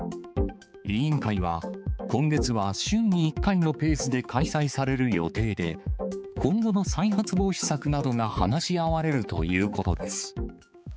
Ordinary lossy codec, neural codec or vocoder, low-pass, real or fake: none; codec, 16 kHz, 4 kbps, X-Codec, HuBERT features, trained on balanced general audio; none; fake